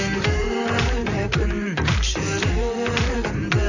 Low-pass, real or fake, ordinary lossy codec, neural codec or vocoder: 7.2 kHz; fake; none; vocoder, 44.1 kHz, 128 mel bands, Pupu-Vocoder